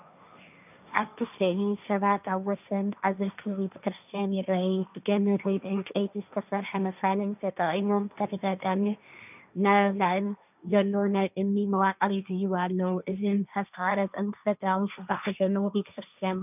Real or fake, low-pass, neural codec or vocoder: fake; 3.6 kHz; codec, 16 kHz, 1.1 kbps, Voila-Tokenizer